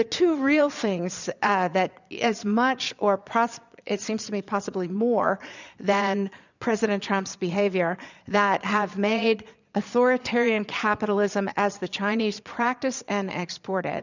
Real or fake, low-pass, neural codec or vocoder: fake; 7.2 kHz; vocoder, 22.05 kHz, 80 mel bands, Vocos